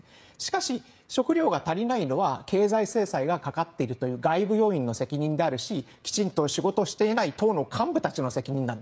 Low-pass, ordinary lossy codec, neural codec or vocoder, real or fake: none; none; codec, 16 kHz, 16 kbps, FreqCodec, smaller model; fake